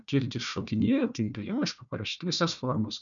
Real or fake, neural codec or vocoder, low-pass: fake; codec, 16 kHz, 1 kbps, FunCodec, trained on Chinese and English, 50 frames a second; 7.2 kHz